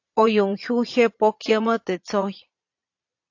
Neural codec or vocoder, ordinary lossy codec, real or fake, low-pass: vocoder, 22.05 kHz, 80 mel bands, Vocos; AAC, 48 kbps; fake; 7.2 kHz